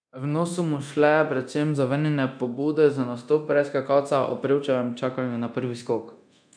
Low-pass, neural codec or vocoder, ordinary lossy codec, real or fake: 9.9 kHz; codec, 24 kHz, 0.9 kbps, DualCodec; none; fake